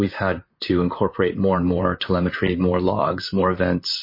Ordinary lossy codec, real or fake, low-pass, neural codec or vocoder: MP3, 24 kbps; fake; 5.4 kHz; vocoder, 44.1 kHz, 128 mel bands, Pupu-Vocoder